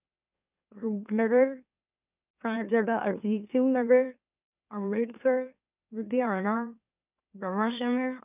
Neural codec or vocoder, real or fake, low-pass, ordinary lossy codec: autoencoder, 44.1 kHz, a latent of 192 numbers a frame, MeloTTS; fake; 3.6 kHz; none